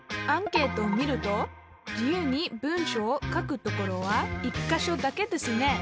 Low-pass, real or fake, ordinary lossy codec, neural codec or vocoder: none; real; none; none